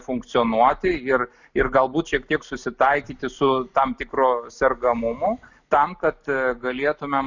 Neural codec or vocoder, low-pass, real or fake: none; 7.2 kHz; real